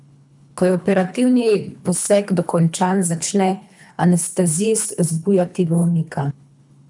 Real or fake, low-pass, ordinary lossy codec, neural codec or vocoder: fake; 10.8 kHz; none; codec, 24 kHz, 3 kbps, HILCodec